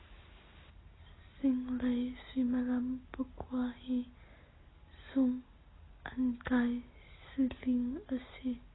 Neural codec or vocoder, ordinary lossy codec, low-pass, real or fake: none; AAC, 16 kbps; 7.2 kHz; real